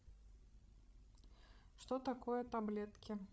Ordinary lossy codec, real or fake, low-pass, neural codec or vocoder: none; fake; none; codec, 16 kHz, 16 kbps, FreqCodec, larger model